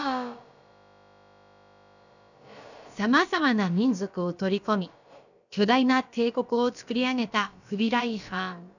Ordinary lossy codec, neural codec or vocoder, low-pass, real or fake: none; codec, 16 kHz, about 1 kbps, DyCAST, with the encoder's durations; 7.2 kHz; fake